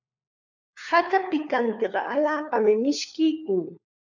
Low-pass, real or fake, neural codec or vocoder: 7.2 kHz; fake; codec, 16 kHz, 4 kbps, FunCodec, trained on LibriTTS, 50 frames a second